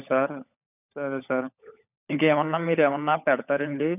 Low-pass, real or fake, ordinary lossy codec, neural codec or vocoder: 3.6 kHz; fake; none; codec, 16 kHz, 16 kbps, FunCodec, trained on LibriTTS, 50 frames a second